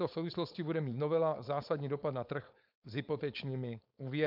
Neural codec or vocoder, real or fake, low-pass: codec, 16 kHz, 4.8 kbps, FACodec; fake; 5.4 kHz